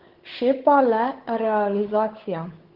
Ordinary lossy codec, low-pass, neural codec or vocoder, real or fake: Opus, 16 kbps; 5.4 kHz; codec, 16 kHz, 8 kbps, FunCodec, trained on Chinese and English, 25 frames a second; fake